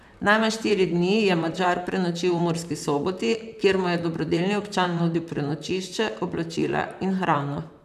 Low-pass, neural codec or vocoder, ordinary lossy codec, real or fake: 14.4 kHz; vocoder, 44.1 kHz, 128 mel bands, Pupu-Vocoder; none; fake